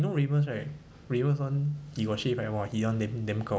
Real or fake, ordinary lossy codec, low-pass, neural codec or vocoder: real; none; none; none